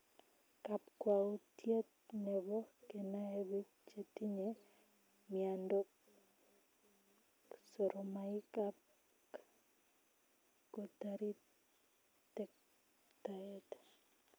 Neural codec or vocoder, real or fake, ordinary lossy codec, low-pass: none; real; none; none